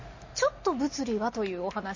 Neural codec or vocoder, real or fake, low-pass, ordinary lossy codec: none; real; 7.2 kHz; MP3, 32 kbps